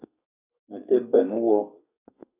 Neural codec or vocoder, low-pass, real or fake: codec, 32 kHz, 1.9 kbps, SNAC; 3.6 kHz; fake